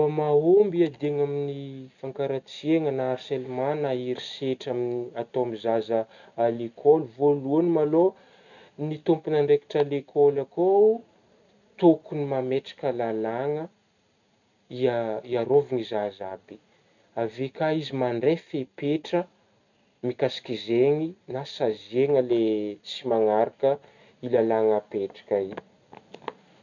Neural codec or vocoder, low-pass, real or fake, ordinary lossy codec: none; 7.2 kHz; real; none